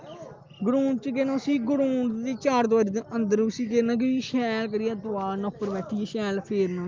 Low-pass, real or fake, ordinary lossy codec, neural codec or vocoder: 7.2 kHz; real; Opus, 32 kbps; none